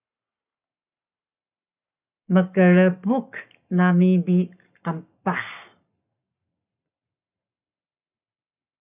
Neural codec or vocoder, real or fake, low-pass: codec, 44.1 kHz, 7.8 kbps, Pupu-Codec; fake; 3.6 kHz